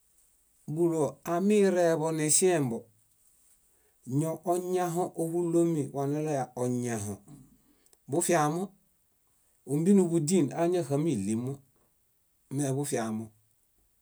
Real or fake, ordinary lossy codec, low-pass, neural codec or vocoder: real; none; none; none